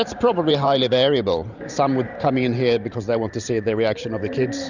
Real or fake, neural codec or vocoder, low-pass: fake; vocoder, 44.1 kHz, 128 mel bands every 512 samples, BigVGAN v2; 7.2 kHz